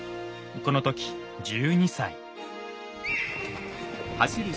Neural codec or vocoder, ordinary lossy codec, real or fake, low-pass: none; none; real; none